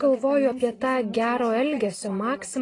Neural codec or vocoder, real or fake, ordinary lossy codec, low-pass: none; real; AAC, 32 kbps; 10.8 kHz